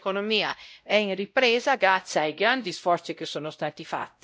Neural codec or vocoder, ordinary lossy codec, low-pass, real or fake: codec, 16 kHz, 0.5 kbps, X-Codec, WavLM features, trained on Multilingual LibriSpeech; none; none; fake